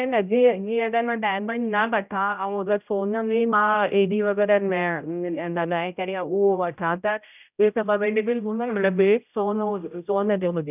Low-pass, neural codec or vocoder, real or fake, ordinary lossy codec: 3.6 kHz; codec, 16 kHz, 0.5 kbps, X-Codec, HuBERT features, trained on general audio; fake; none